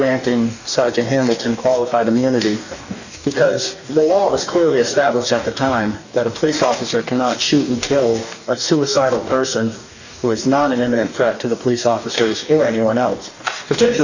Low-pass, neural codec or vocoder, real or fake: 7.2 kHz; codec, 44.1 kHz, 2.6 kbps, DAC; fake